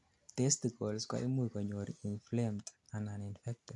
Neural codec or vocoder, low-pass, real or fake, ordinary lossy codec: none; 10.8 kHz; real; AAC, 64 kbps